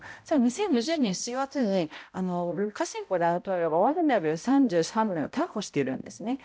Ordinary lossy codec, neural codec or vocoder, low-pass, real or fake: none; codec, 16 kHz, 0.5 kbps, X-Codec, HuBERT features, trained on balanced general audio; none; fake